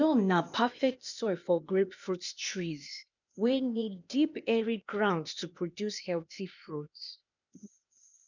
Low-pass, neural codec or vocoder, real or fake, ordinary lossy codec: 7.2 kHz; codec, 16 kHz, 0.8 kbps, ZipCodec; fake; none